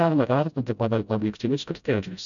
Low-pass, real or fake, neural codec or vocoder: 7.2 kHz; fake; codec, 16 kHz, 0.5 kbps, FreqCodec, smaller model